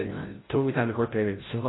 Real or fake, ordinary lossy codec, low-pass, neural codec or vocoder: fake; AAC, 16 kbps; 7.2 kHz; codec, 16 kHz, 0.5 kbps, FreqCodec, larger model